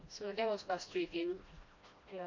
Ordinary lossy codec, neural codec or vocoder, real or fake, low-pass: MP3, 48 kbps; codec, 16 kHz, 1 kbps, FreqCodec, smaller model; fake; 7.2 kHz